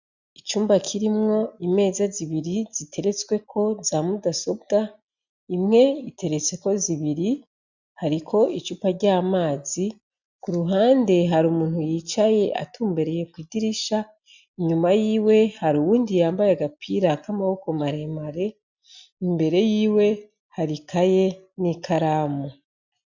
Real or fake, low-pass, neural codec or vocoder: real; 7.2 kHz; none